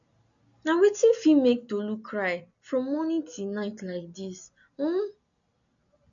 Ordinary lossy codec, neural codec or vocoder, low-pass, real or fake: none; none; 7.2 kHz; real